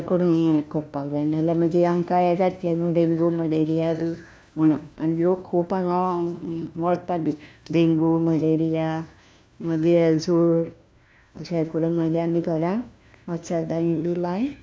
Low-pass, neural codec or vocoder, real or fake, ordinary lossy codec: none; codec, 16 kHz, 1 kbps, FunCodec, trained on LibriTTS, 50 frames a second; fake; none